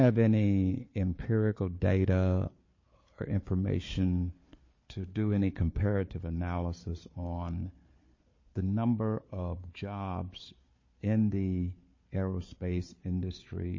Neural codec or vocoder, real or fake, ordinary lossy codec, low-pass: codec, 16 kHz, 4 kbps, FunCodec, trained on Chinese and English, 50 frames a second; fake; MP3, 32 kbps; 7.2 kHz